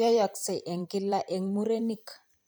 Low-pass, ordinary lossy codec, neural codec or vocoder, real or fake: none; none; vocoder, 44.1 kHz, 128 mel bands every 512 samples, BigVGAN v2; fake